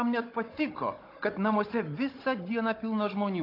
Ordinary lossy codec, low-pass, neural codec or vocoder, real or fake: AAC, 32 kbps; 5.4 kHz; codec, 16 kHz, 16 kbps, FunCodec, trained on Chinese and English, 50 frames a second; fake